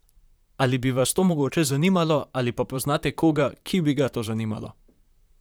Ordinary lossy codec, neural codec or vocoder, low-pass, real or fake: none; vocoder, 44.1 kHz, 128 mel bands, Pupu-Vocoder; none; fake